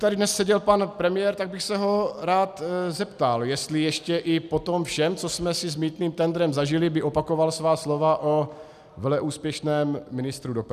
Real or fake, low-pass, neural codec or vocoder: real; 14.4 kHz; none